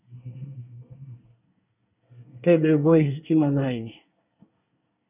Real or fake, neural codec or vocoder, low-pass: fake; codec, 24 kHz, 1 kbps, SNAC; 3.6 kHz